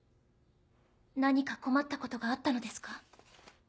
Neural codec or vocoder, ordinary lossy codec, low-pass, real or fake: none; none; none; real